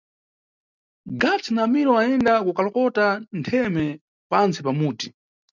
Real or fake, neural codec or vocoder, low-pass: real; none; 7.2 kHz